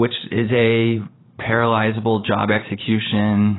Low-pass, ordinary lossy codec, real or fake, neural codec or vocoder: 7.2 kHz; AAC, 16 kbps; fake; vocoder, 44.1 kHz, 128 mel bands every 512 samples, BigVGAN v2